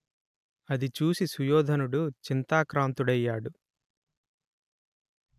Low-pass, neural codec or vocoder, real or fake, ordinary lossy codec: 14.4 kHz; none; real; none